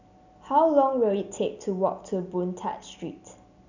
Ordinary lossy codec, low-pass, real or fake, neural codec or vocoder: none; 7.2 kHz; real; none